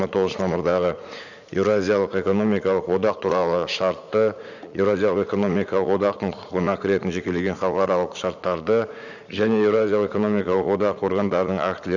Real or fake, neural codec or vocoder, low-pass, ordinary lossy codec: fake; vocoder, 44.1 kHz, 80 mel bands, Vocos; 7.2 kHz; none